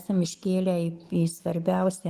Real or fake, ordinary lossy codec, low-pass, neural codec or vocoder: real; Opus, 24 kbps; 14.4 kHz; none